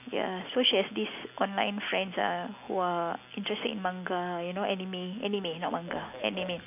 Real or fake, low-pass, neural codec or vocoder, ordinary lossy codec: real; 3.6 kHz; none; none